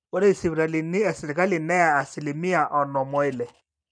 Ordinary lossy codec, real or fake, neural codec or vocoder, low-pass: none; fake; vocoder, 44.1 kHz, 128 mel bands every 256 samples, BigVGAN v2; 9.9 kHz